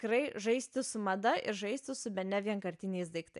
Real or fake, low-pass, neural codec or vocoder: real; 10.8 kHz; none